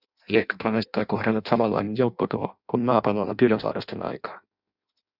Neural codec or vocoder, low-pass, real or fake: codec, 16 kHz in and 24 kHz out, 0.6 kbps, FireRedTTS-2 codec; 5.4 kHz; fake